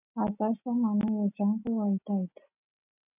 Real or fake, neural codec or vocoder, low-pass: real; none; 3.6 kHz